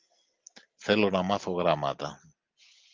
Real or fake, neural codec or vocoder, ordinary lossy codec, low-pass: real; none; Opus, 24 kbps; 7.2 kHz